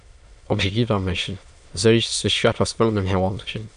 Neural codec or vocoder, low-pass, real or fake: autoencoder, 22.05 kHz, a latent of 192 numbers a frame, VITS, trained on many speakers; 9.9 kHz; fake